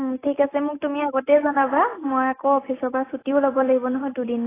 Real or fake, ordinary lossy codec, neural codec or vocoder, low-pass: real; AAC, 16 kbps; none; 3.6 kHz